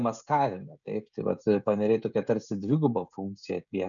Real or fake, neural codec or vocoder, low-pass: fake; codec, 16 kHz, 16 kbps, FreqCodec, smaller model; 7.2 kHz